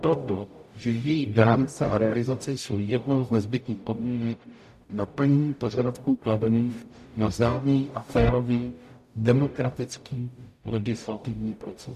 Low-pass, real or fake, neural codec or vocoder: 14.4 kHz; fake; codec, 44.1 kHz, 0.9 kbps, DAC